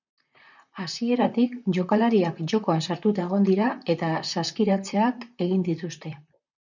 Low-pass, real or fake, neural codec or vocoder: 7.2 kHz; fake; vocoder, 44.1 kHz, 128 mel bands, Pupu-Vocoder